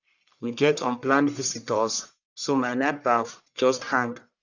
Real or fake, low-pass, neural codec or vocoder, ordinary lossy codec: fake; 7.2 kHz; codec, 44.1 kHz, 1.7 kbps, Pupu-Codec; none